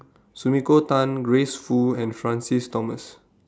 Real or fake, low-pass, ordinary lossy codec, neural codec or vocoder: real; none; none; none